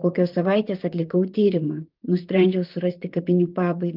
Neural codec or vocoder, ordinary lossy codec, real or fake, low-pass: vocoder, 44.1 kHz, 128 mel bands, Pupu-Vocoder; Opus, 24 kbps; fake; 5.4 kHz